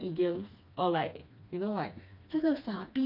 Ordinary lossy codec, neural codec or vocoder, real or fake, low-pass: none; codec, 16 kHz, 2 kbps, FreqCodec, smaller model; fake; 5.4 kHz